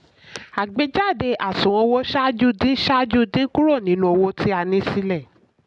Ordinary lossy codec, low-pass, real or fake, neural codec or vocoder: none; 10.8 kHz; real; none